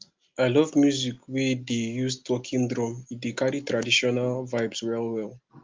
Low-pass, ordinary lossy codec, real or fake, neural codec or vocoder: 7.2 kHz; Opus, 32 kbps; real; none